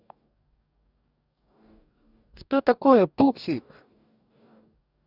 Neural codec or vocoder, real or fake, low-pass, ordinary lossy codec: codec, 44.1 kHz, 2.6 kbps, DAC; fake; 5.4 kHz; none